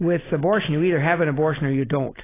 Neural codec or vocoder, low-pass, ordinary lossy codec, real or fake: none; 3.6 kHz; AAC, 16 kbps; real